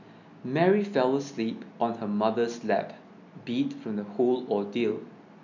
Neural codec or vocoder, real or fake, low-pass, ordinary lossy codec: none; real; 7.2 kHz; none